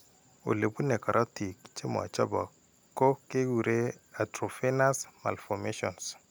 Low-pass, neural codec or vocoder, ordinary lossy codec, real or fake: none; none; none; real